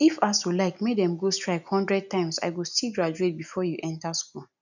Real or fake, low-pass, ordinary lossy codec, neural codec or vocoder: real; 7.2 kHz; none; none